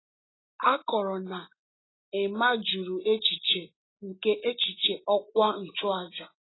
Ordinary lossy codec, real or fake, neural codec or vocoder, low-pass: AAC, 16 kbps; real; none; 7.2 kHz